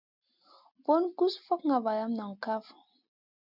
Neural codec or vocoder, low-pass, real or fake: none; 5.4 kHz; real